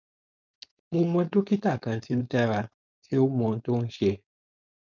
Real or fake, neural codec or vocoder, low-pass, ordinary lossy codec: fake; codec, 16 kHz, 4.8 kbps, FACodec; 7.2 kHz; none